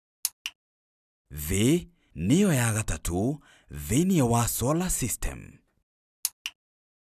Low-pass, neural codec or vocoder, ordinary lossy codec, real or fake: 14.4 kHz; none; none; real